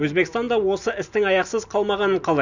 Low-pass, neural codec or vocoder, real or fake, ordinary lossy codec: 7.2 kHz; none; real; none